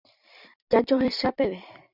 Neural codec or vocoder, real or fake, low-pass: vocoder, 44.1 kHz, 128 mel bands every 256 samples, BigVGAN v2; fake; 5.4 kHz